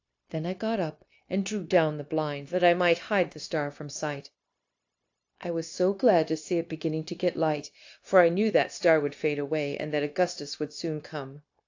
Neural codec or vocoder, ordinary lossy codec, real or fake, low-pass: codec, 16 kHz, 0.9 kbps, LongCat-Audio-Codec; AAC, 48 kbps; fake; 7.2 kHz